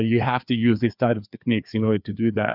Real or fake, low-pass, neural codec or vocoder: fake; 5.4 kHz; codec, 16 kHz, 2 kbps, FreqCodec, larger model